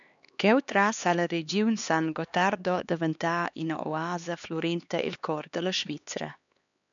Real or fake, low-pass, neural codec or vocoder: fake; 7.2 kHz; codec, 16 kHz, 2 kbps, X-Codec, HuBERT features, trained on LibriSpeech